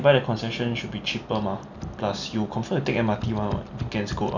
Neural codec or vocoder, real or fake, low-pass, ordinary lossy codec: none; real; 7.2 kHz; none